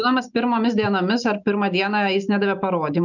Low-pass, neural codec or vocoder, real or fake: 7.2 kHz; none; real